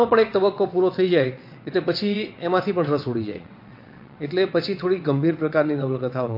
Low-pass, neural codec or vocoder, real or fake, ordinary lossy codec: 5.4 kHz; vocoder, 22.05 kHz, 80 mel bands, WaveNeXt; fake; MP3, 32 kbps